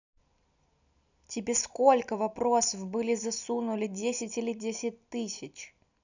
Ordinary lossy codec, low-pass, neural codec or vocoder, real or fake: none; 7.2 kHz; none; real